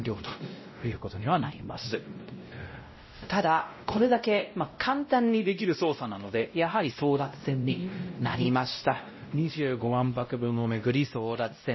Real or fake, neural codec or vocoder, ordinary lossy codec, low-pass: fake; codec, 16 kHz, 0.5 kbps, X-Codec, WavLM features, trained on Multilingual LibriSpeech; MP3, 24 kbps; 7.2 kHz